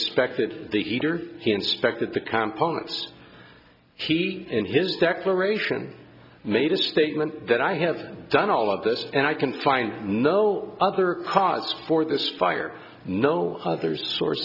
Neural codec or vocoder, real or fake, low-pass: none; real; 5.4 kHz